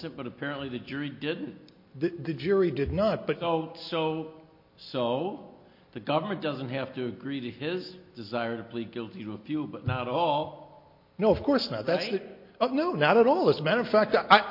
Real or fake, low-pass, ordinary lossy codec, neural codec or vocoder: real; 5.4 kHz; MP3, 32 kbps; none